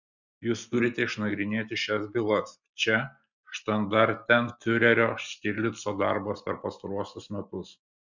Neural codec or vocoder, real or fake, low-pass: none; real; 7.2 kHz